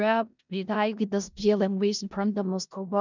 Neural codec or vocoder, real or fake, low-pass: codec, 16 kHz in and 24 kHz out, 0.4 kbps, LongCat-Audio-Codec, four codebook decoder; fake; 7.2 kHz